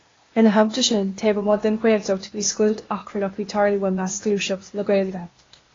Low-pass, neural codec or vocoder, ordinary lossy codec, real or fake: 7.2 kHz; codec, 16 kHz, 0.8 kbps, ZipCodec; AAC, 32 kbps; fake